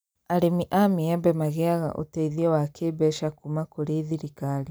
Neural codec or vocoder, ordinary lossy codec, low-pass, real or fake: none; none; none; real